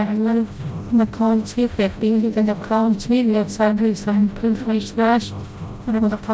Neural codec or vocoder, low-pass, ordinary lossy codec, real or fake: codec, 16 kHz, 0.5 kbps, FreqCodec, smaller model; none; none; fake